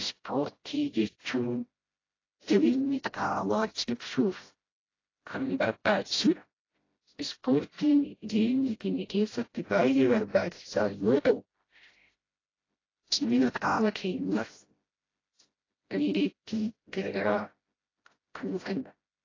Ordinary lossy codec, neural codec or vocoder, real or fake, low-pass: AAC, 32 kbps; codec, 16 kHz, 0.5 kbps, FreqCodec, smaller model; fake; 7.2 kHz